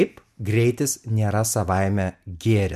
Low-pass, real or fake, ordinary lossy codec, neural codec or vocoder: 14.4 kHz; real; MP3, 96 kbps; none